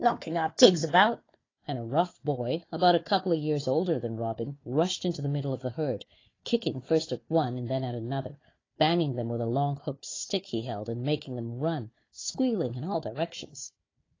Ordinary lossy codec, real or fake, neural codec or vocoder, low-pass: AAC, 32 kbps; fake; codec, 16 kHz, 4 kbps, FunCodec, trained on Chinese and English, 50 frames a second; 7.2 kHz